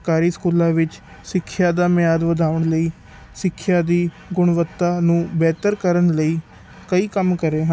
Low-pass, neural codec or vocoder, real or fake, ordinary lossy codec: none; none; real; none